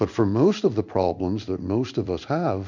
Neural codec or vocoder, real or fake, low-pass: codec, 16 kHz in and 24 kHz out, 1 kbps, XY-Tokenizer; fake; 7.2 kHz